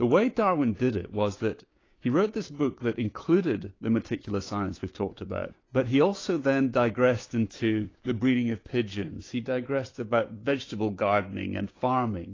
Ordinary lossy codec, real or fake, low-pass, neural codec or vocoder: AAC, 32 kbps; fake; 7.2 kHz; codec, 16 kHz, 6 kbps, DAC